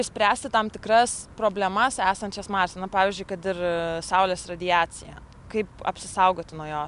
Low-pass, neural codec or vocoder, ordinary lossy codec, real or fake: 10.8 kHz; none; MP3, 96 kbps; real